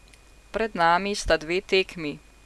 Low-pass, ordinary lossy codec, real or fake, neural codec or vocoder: none; none; real; none